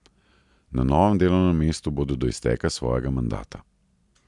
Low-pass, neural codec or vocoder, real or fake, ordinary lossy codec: 10.8 kHz; none; real; MP3, 96 kbps